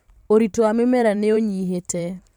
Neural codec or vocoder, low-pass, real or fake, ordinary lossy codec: vocoder, 44.1 kHz, 128 mel bands every 512 samples, BigVGAN v2; 19.8 kHz; fake; MP3, 96 kbps